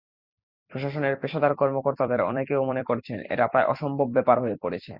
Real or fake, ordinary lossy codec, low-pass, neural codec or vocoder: fake; Opus, 64 kbps; 5.4 kHz; vocoder, 44.1 kHz, 128 mel bands every 256 samples, BigVGAN v2